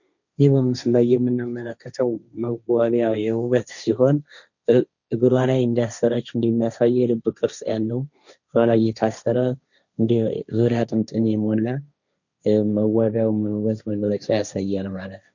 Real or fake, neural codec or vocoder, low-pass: fake; codec, 16 kHz, 1.1 kbps, Voila-Tokenizer; 7.2 kHz